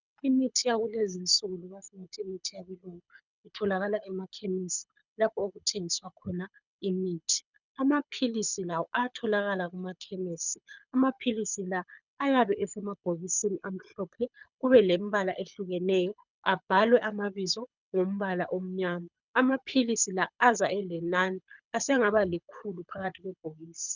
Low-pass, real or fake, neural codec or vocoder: 7.2 kHz; fake; codec, 24 kHz, 6 kbps, HILCodec